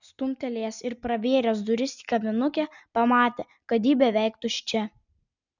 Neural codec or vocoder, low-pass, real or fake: none; 7.2 kHz; real